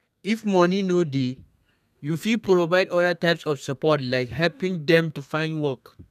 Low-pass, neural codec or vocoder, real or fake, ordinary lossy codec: 14.4 kHz; codec, 32 kHz, 1.9 kbps, SNAC; fake; none